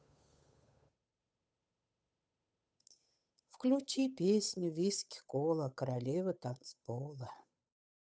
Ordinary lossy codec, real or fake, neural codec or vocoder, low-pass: none; fake; codec, 16 kHz, 8 kbps, FunCodec, trained on Chinese and English, 25 frames a second; none